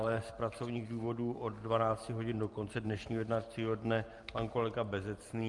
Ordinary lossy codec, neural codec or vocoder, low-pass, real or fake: Opus, 32 kbps; none; 10.8 kHz; real